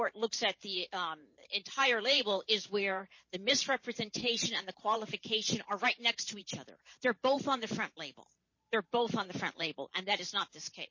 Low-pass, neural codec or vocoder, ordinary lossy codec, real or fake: 7.2 kHz; vocoder, 44.1 kHz, 128 mel bands every 512 samples, BigVGAN v2; MP3, 32 kbps; fake